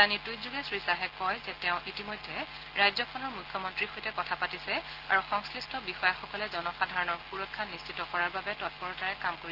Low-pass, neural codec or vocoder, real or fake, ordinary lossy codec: 5.4 kHz; none; real; Opus, 16 kbps